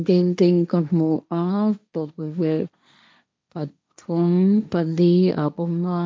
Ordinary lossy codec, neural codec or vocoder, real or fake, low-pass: none; codec, 16 kHz, 1.1 kbps, Voila-Tokenizer; fake; none